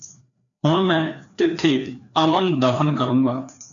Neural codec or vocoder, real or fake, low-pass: codec, 16 kHz, 2 kbps, FreqCodec, larger model; fake; 7.2 kHz